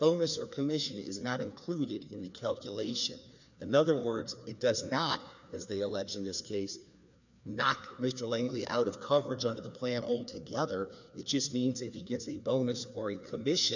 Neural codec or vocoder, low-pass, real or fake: codec, 16 kHz, 2 kbps, FreqCodec, larger model; 7.2 kHz; fake